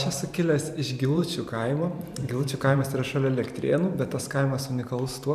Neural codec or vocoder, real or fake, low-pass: vocoder, 44.1 kHz, 128 mel bands every 512 samples, BigVGAN v2; fake; 14.4 kHz